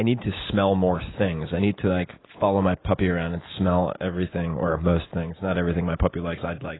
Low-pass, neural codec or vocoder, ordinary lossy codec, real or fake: 7.2 kHz; none; AAC, 16 kbps; real